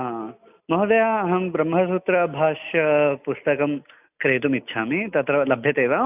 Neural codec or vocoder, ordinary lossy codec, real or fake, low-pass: none; none; real; 3.6 kHz